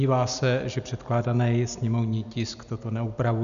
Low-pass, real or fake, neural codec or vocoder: 7.2 kHz; real; none